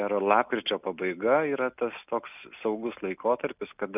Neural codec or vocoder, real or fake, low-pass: none; real; 3.6 kHz